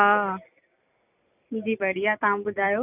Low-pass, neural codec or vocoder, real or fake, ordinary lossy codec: 3.6 kHz; none; real; none